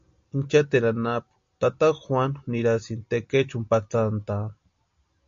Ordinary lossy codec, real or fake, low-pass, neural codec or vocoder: MP3, 48 kbps; real; 7.2 kHz; none